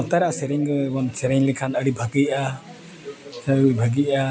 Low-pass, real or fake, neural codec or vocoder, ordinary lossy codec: none; real; none; none